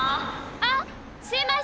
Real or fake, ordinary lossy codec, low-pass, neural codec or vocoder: real; none; none; none